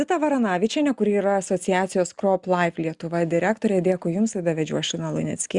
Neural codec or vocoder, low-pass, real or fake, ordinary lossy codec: none; 10.8 kHz; real; Opus, 64 kbps